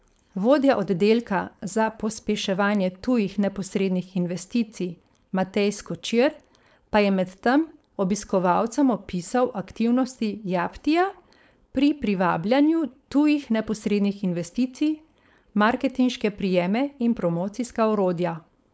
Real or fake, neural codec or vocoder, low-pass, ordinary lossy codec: fake; codec, 16 kHz, 4.8 kbps, FACodec; none; none